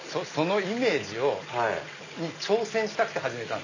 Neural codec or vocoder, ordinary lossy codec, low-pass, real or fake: none; none; 7.2 kHz; real